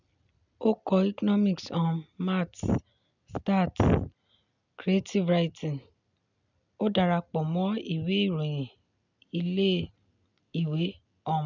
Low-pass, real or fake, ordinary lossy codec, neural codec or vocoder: 7.2 kHz; real; none; none